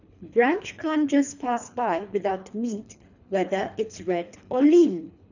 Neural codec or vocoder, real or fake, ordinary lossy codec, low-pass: codec, 24 kHz, 3 kbps, HILCodec; fake; none; 7.2 kHz